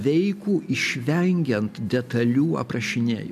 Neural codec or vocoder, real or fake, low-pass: none; real; 14.4 kHz